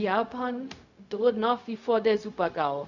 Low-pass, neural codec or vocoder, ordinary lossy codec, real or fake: 7.2 kHz; codec, 16 kHz, 0.4 kbps, LongCat-Audio-Codec; none; fake